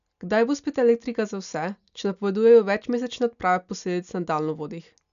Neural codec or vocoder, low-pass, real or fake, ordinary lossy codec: none; 7.2 kHz; real; none